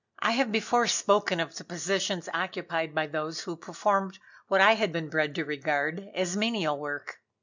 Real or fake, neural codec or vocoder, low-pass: real; none; 7.2 kHz